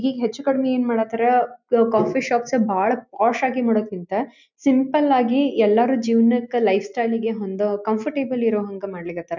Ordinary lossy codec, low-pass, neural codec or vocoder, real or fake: none; 7.2 kHz; none; real